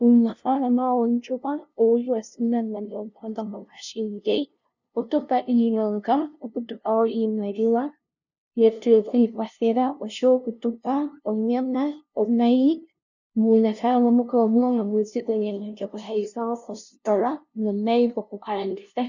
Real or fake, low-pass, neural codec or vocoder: fake; 7.2 kHz; codec, 16 kHz, 0.5 kbps, FunCodec, trained on LibriTTS, 25 frames a second